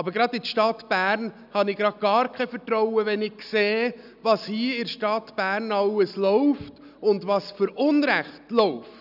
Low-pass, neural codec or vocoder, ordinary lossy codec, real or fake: 5.4 kHz; none; none; real